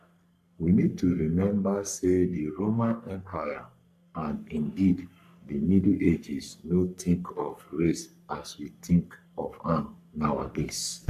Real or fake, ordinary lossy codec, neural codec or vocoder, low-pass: fake; none; codec, 44.1 kHz, 3.4 kbps, Pupu-Codec; 14.4 kHz